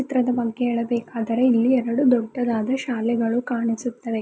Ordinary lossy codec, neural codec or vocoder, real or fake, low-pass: none; none; real; none